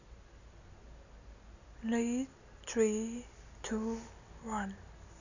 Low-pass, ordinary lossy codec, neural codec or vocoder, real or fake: 7.2 kHz; none; none; real